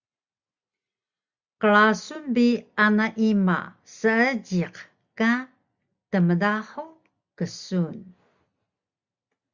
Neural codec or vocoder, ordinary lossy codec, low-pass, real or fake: none; Opus, 64 kbps; 7.2 kHz; real